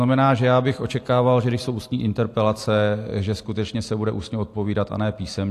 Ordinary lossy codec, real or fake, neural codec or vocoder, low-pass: AAC, 64 kbps; real; none; 14.4 kHz